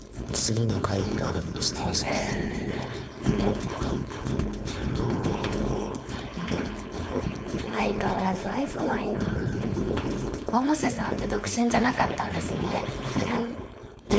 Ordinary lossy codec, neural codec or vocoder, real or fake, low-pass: none; codec, 16 kHz, 4.8 kbps, FACodec; fake; none